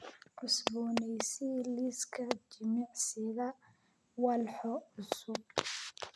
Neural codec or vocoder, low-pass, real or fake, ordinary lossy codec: none; none; real; none